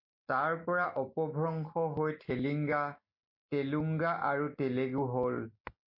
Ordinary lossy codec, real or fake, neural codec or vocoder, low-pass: MP3, 32 kbps; real; none; 5.4 kHz